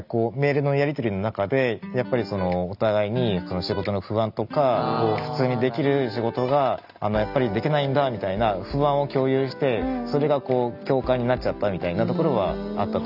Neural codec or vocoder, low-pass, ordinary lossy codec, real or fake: none; 5.4 kHz; none; real